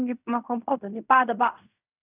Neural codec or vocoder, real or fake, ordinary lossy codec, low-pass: codec, 16 kHz in and 24 kHz out, 0.4 kbps, LongCat-Audio-Codec, fine tuned four codebook decoder; fake; none; 3.6 kHz